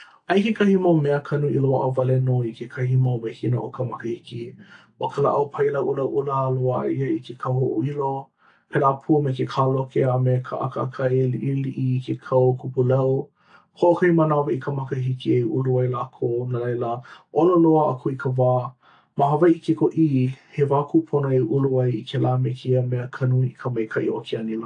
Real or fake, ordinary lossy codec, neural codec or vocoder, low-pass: real; AAC, 48 kbps; none; 9.9 kHz